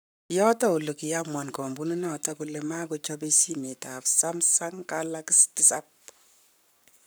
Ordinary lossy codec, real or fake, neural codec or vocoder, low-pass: none; fake; codec, 44.1 kHz, 7.8 kbps, Pupu-Codec; none